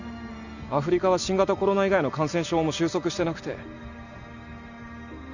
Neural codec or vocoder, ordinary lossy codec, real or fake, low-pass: none; none; real; 7.2 kHz